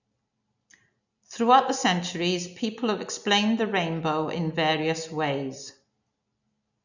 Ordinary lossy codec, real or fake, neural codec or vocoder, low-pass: none; real; none; 7.2 kHz